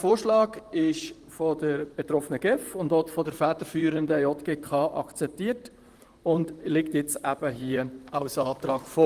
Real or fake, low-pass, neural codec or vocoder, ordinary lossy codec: fake; 14.4 kHz; vocoder, 44.1 kHz, 128 mel bands, Pupu-Vocoder; Opus, 24 kbps